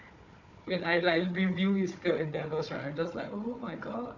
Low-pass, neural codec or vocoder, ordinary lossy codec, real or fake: 7.2 kHz; codec, 16 kHz, 4 kbps, FunCodec, trained on Chinese and English, 50 frames a second; none; fake